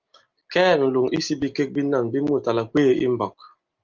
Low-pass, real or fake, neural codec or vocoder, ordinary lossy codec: 7.2 kHz; real; none; Opus, 32 kbps